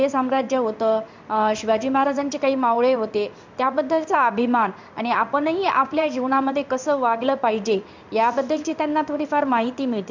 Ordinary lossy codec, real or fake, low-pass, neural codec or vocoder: MP3, 64 kbps; fake; 7.2 kHz; codec, 16 kHz in and 24 kHz out, 1 kbps, XY-Tokenizer